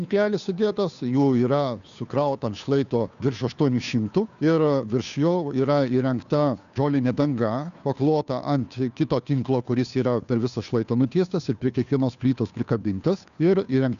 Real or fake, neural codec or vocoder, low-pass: fake; codec, 16 kHz, 2 kbps, FunCodec, trained on Chinese and English, 25 frames a second; 7.2 kHz